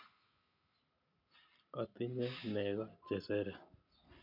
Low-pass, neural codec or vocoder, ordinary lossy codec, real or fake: 5.4 kHz; none; none; real